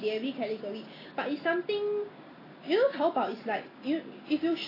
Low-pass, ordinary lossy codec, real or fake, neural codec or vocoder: 5.4 kHz; AAC, 24 kbps; real; none